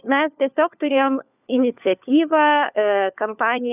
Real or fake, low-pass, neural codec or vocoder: fake; 3.6 kHz; codec, 16 kHz, 4 kbps, FunCodec, trained on LibriTTS, 50 frames a second